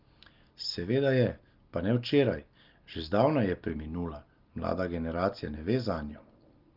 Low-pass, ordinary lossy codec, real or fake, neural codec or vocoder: 5.4 kHz; Opus, 32 kbps; real; none